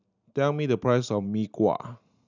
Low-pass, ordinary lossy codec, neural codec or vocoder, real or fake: 7.2 kHz; none; none; real